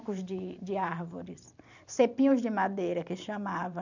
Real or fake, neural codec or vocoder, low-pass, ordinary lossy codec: fake; vocoder, 44.1 kHz, 128 mel bands every 256 samples, BigVGAN v2; 7.2 kHz; none